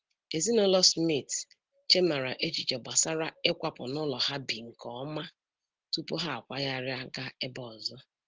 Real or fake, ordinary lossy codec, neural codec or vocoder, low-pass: real; Opus, 16 kbps; none; 7.2 kHz